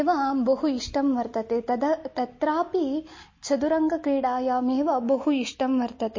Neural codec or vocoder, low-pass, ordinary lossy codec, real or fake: none; 7.2 kHz; MP3, 32 kbps; real